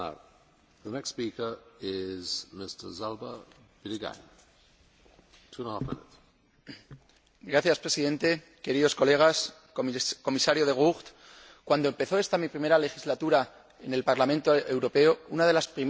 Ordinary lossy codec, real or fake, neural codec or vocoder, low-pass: none; real; none; none